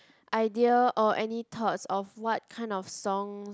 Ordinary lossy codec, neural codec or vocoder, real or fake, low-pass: none; none; real; none